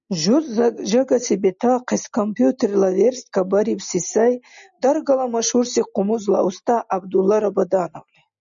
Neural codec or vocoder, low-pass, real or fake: none; 7.2 kHz; real